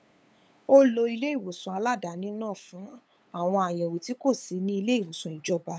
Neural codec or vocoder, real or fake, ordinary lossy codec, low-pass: codec, 16 kHz, 8 kbps, FunCodec, trained on LibriTTS, 25 frames a second; fake; none; none